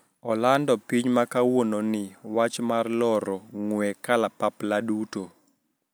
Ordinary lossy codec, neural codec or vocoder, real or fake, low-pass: none; none; real; none